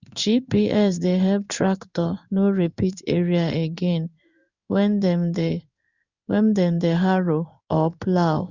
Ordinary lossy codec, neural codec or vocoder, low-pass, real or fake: Opus, 64 kbps; codec, 16 kHz in and 24 kHz out, 1 kbps, XY-Tokenizer; 7.2 kHz; fake